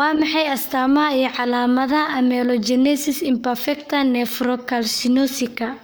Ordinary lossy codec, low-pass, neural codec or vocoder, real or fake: none; none; codec, 44.1 kHz, 7.8 kbps, Pupu-Codec; fake